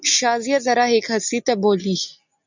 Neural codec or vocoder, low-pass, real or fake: none; 7.2 kHz; real